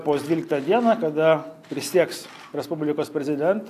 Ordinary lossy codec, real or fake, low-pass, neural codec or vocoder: AAC, 64 kbps; real; 14.4 kHz; none